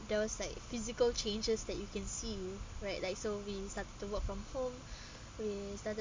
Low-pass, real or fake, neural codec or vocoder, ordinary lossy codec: 7.2 kHz; real; none; MP3, 64 kbps